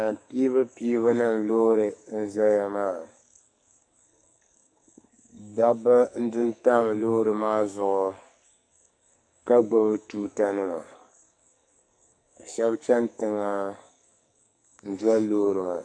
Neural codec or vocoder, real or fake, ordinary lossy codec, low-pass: codec, 44.1 kHz, 2.6 kbps, SNAC; fake; AAC, 64 kbps; 9.9 kHz